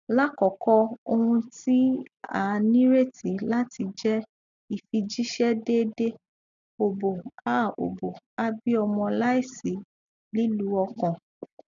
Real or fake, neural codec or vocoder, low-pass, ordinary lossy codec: real; none; 7.2 kHz; none